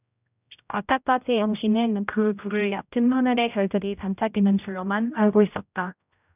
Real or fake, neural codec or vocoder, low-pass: fake; codec, 16 kHz, 0.5 kbps, X-Codec, HuBERT features, trained on general audio; 3.6 kHz